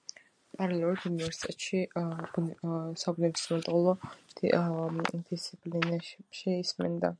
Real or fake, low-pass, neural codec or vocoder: real; 9.9 kHz; none